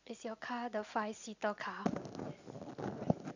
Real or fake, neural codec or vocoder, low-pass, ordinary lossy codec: real; none; 7.2 kHz; none